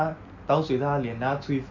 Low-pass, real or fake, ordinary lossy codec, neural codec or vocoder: 7.2 kHz; real; none; none